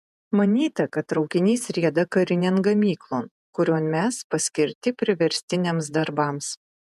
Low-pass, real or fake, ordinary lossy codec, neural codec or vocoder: 14.4 kHz; fake; MP3, 96 kbps; vocoder, 48 kHz, 128 mel bands, Vocos